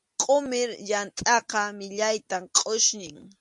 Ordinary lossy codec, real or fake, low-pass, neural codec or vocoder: MP3, 48 kbps; real; 10.8 kHz; none